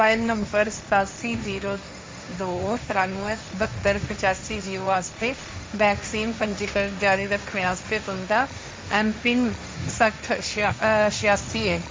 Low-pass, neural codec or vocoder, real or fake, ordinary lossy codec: none; codec, 16 kHz, 1.1 kbps, Voila-Tokenizer; fake; none